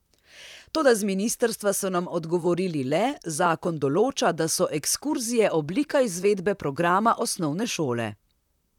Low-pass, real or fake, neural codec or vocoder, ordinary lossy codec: 19.8 kHz; fake; vocoder, 44.1 kHz, 128 mel bands, Pupu-Vocoder; none